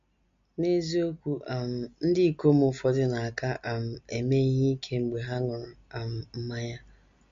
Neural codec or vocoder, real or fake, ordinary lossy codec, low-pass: none; real; MP3, 48 kbps; 7.2 kHz